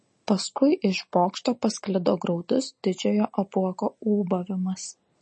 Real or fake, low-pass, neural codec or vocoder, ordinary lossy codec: real; 10.8 kHz; none; MP3, 32 kbps